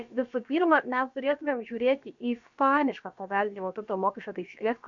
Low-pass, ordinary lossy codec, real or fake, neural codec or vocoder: 7.2 kHz; AAC, 64 kbps; fake; codec, 16 kHz, about 1 kbps, DyCAST, with the encoder's durations